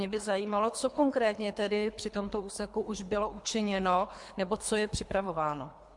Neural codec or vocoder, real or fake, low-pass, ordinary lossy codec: codec, 24 kHz, 3 kbps, HILCodec; fake; 10.8 kHz; MP3, 64 kbps